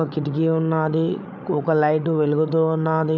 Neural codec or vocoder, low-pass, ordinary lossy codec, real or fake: codec, 16 kHz, 16 kbps, FreqCodec, larger model; 7.2 kHz; none; fake